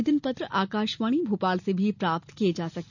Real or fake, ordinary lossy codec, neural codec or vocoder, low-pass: real; none; none; 7.2 kHz